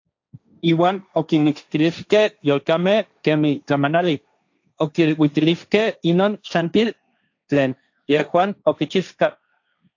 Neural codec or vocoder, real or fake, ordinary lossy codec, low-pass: codec, 16 kHz, 1.1 kbps, Voila-Tokenizer; fake; AAC, 48 kbps; 7.2 kHz